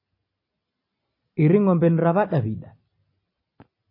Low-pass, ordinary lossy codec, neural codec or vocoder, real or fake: 5.4 kHz; MP3, 24 kbps; none; real